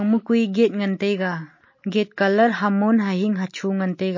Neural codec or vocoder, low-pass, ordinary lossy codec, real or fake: none; 7.2 kHz; MP3, 32 kbps; real